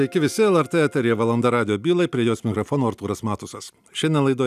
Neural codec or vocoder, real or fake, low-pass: none; real; 14.4 kHz